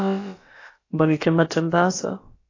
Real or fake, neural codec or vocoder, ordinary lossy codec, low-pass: fake; codec, 16 kHz, about 1 kbps, DyCAST, with the encoder's durations; AAC, 32 kbps; 7.2 kHz